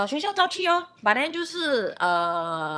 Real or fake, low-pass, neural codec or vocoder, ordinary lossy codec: fake; none; vocoder, 22.05 kHz, 80 mel bands, HiFi-GAN; none